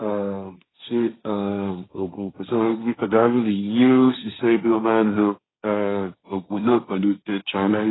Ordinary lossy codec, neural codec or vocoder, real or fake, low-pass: AAC, 16 kbps; codec, 16 kHz, 1.1 kbps, Voila-Tokenizer; fake; 7.2 kHz